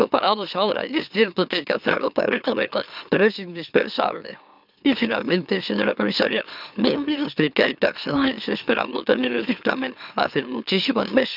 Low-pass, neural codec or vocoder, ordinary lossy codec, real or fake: 5.4 kHz; autoencoder, 44.1 kHz, a latent of 192 numbers a frame, MeloTTS; none; fake